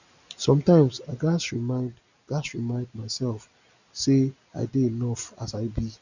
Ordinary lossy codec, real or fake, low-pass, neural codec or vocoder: none; real; 7.2 kHz; none